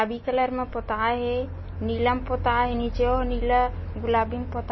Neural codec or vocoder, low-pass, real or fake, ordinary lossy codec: none; 7.2 kHz; real; MP3, 24 kbps